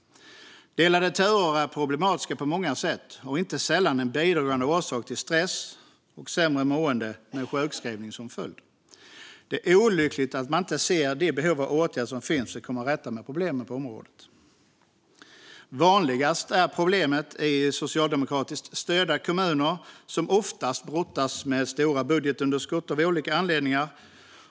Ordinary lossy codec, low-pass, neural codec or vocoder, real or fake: none; none; none; real